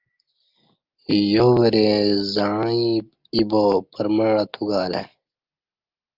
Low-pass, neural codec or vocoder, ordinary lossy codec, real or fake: 5.4 kHz; none; Opus, 32 kbps; real